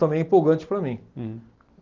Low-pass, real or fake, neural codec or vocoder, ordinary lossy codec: 7.2 kHz; real; none; Opus, 16 kbps